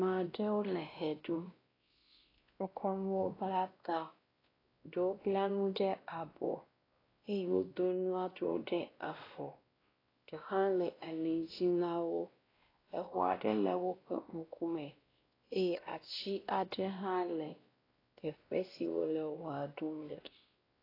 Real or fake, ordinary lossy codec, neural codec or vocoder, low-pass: fake; AAC, 24 kbps; codec, 16 kHz, 1 kbps, X-Codec, WavLM features, trained on Multilingual LibriSpeech; 5.4 kHz